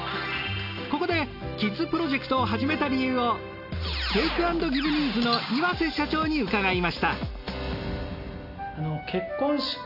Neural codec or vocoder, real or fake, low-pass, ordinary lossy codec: none; real; 5.4 kHz; none